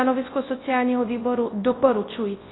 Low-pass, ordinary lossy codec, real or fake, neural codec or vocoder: 7.2 kHz; AAC, 16 kbps; fake; codec, 24 kHz, 0.9 kbps, WavTokenizer, large speech release